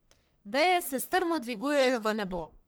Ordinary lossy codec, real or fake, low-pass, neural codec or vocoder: none; fake; none; codec, 44.1 kHz, 1.7 kbps, Pupu-Codec